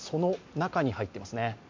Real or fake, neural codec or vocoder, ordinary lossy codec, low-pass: real; none; MP3, 48 kbps; 7.2 kHz